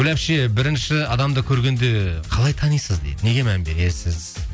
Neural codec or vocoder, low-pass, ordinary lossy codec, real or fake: none; none; none; real